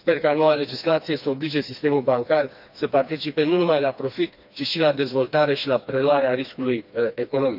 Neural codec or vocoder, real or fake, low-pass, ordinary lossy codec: codec, 16 kHz, 2 kbps, FreqCodec, smaller model; fake; 5.4 kHz; none